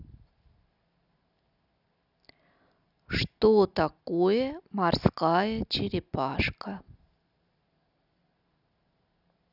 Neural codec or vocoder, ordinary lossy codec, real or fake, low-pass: none; none; real; 5.4 kHz